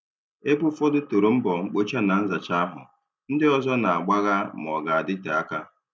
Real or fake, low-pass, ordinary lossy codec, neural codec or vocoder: real; none; none; none